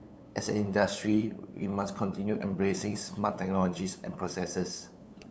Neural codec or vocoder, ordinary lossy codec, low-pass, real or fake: codec, 16 kHz, 8 kbps, FunCodec, trained on LibriTTS, 25 frames a second; none; none; fake